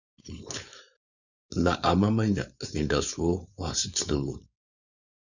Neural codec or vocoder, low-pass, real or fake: codec, 16 kHz, 4.8 kbps, FACodec; 7.2 kHz; fake